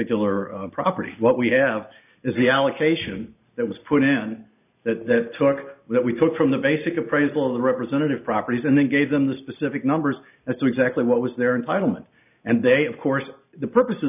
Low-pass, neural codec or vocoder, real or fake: 3.6 kHz; none; real